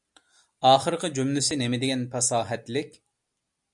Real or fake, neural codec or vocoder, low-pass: real; none; 10.8 kHz